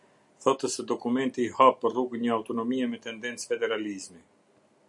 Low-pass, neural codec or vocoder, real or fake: 10.8 kHz; none; real